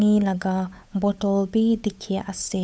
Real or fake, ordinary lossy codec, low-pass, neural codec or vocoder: fake; none; none; codec, 16 kHz, 8 kbps, FunCodec, trained on Chinese and English, 25 frames a second